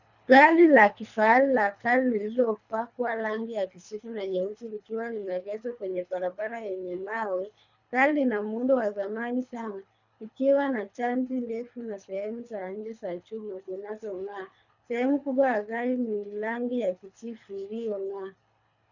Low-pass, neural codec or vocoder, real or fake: 7.2 kHz; codec, 24 kHz, 3 kbps, HILCodec; fake